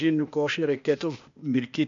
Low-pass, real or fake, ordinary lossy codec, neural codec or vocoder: 7.2 kHz; fake; MP3, 64 kbps; codec, 16 kHz, 0.8 kbps, ZipCodec